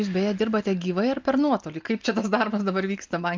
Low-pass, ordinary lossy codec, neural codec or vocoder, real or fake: 7.2 kHz; Opus, 24 kbps; none; real